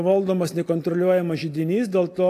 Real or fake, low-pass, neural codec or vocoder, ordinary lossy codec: real; 14.4 kHz; none; AAC, 64 kbps